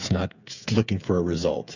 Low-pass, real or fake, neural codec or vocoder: 7.2 kHz; fake; codec, 16 kHz, 8 kbps, FreqCodec, smaller model